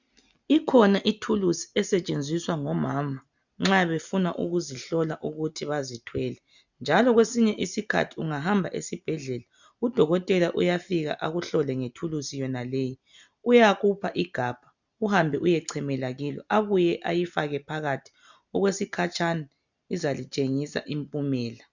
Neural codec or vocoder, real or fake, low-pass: none; real; 7.2 kHz